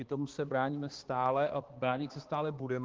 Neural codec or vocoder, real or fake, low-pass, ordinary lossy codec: codec, 16 kHz, 2 kbps, X-Codec, HuBERT features, trained on balanced general audio; fake; 7.2 kHz; Opus, 16 kbps